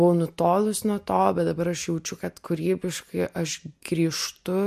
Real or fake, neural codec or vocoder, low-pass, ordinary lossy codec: real; none; 14.4 kHz; MP3, 64 kbps